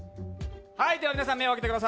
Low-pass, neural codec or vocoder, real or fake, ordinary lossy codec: none; none; real; none